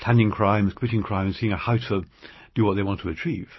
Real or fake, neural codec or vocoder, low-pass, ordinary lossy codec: real; none; 7.2 kHz; MP3, 24 kbps